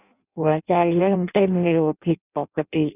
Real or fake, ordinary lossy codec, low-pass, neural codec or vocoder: fake; none; 3.6 kHz; codec, 16 kHz in and 24 kHz out, 0.6 kbps, FireRedTTS-2 codec